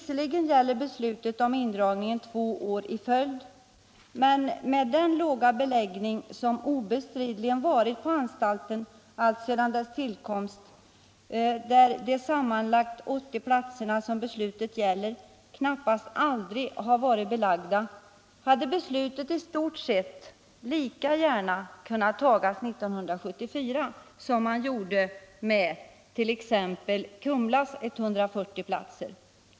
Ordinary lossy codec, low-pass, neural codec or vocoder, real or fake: none; none; none; real